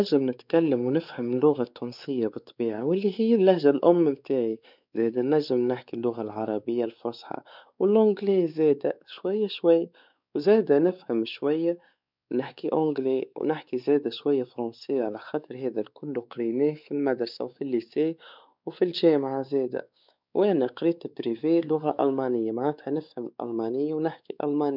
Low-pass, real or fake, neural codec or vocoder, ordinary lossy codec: 5.4 kHz; fake; codec, 16 kHz, 4 kbps, X-Codec, WavLM features, trained on Multilingual LibriSpeech; none